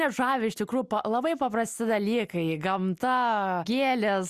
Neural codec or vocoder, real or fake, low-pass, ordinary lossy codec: none; real; 14.4 kHz; Opus, 64 kbps